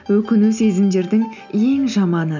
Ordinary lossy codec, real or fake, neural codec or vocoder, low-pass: none; real; none; 7.2 kHz